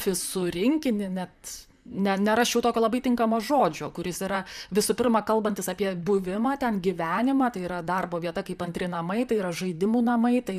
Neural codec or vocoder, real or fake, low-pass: vocoder, 44.1 kHz, 128 mel bands, Pupu-Vocoder; fake; 14.4 kHz